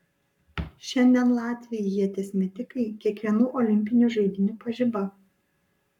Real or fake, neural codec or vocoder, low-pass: fake; codec, 44.1 kHz, 7.8 kbps, Pupu-Codec; 19.8 kHz